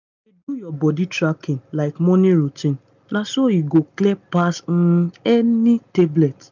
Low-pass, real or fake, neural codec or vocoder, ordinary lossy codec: 7.2 kHz; real; none; none